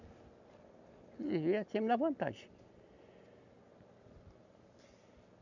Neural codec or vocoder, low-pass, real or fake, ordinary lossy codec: none; 7.2 kHz; real; none